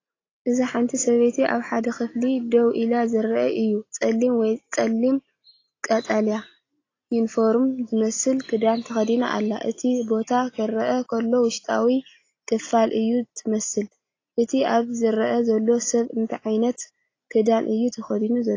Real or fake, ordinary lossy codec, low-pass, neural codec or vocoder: real; AAC, 32 kbps; 7.2 kHz; none